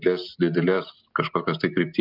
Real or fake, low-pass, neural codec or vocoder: real; 5.4 kHz; none